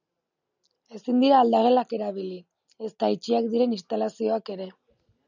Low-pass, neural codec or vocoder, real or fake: 7.2 kHz; none; real